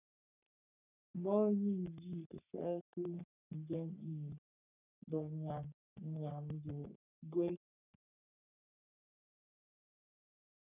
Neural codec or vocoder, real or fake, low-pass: codec, 44.1 kHz, 3.4 kbps, Pupu-Codec; fake; 3.6 kHz